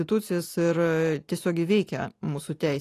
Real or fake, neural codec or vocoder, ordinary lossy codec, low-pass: real; none; AAC, 48 kbps; 14.4 kHz